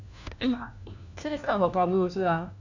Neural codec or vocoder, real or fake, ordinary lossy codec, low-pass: codec, 16 kHz, 1 kbps, FunCodec, trained on LibriTTS, 50 frames a second; fake; none; 7.2 kHz